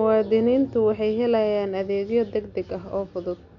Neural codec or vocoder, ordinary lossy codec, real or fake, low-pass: none; none; real; 7.2 kHz